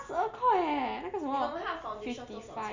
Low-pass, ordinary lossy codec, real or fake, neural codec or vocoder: 7.2 kHz; MP3, 64 kbps; real; none